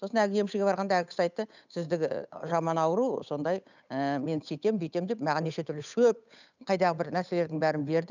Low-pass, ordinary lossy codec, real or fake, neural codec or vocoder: 7.2 kHz; none; fake; codec, 16 kHz, 8 kbps, FunCodec, trained on Chinese and English, 25 frames a second